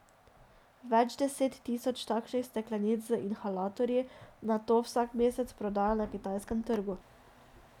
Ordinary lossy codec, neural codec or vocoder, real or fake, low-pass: none; none; real; 19.8 kHz